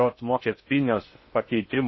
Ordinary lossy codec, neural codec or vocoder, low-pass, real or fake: MP3, 24 kbps; codec, 16 kHz in and 24 kHz out, 0.6 kbps, FocalCodec, streaming, 2048 codes; 7.2 kHz; fake